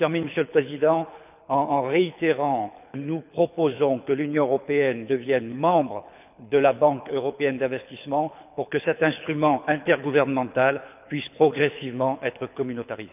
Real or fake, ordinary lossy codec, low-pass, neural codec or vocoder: fake; none; 3.6 kHz; codec, 24 kHz, 6 kbps, HILCodec